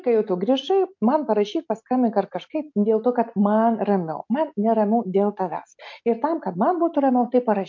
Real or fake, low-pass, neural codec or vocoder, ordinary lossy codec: real; 7.2 kHz; none; MP3, 48 kbps